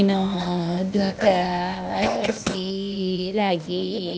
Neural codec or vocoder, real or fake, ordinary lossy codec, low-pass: codec, 16 kHz, 0.8 kbps, ZipCodec; fake; none; none